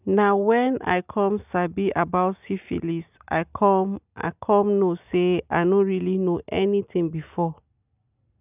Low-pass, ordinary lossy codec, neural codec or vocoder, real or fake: 3.6 kHz; none; none; real